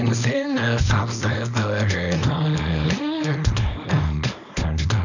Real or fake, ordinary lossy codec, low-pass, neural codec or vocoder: fake; none; 7.2 kHz; codec, 24 kHz, 0.9 kbps, WavTokenizer, small release